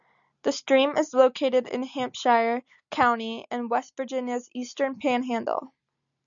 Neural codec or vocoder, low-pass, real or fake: none; 7.2 kHz; real